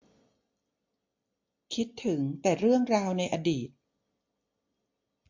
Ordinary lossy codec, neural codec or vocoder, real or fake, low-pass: MP3, 48 kbps; none; real; 7.2 kHz